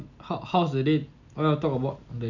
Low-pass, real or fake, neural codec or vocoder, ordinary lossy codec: 7.2 kHz; real; none; none